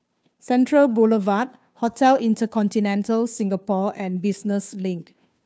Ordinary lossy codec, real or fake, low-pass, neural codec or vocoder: none; fake; none; codec, 16 kHz, 2 kbps, FunCodec, trained on Chinese and English, 25 frames a second